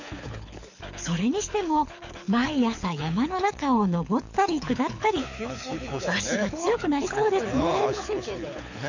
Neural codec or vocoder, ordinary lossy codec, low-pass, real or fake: codec, 24 kHz, 6 kbps, HILCodec; none; 7.2 kHz; fake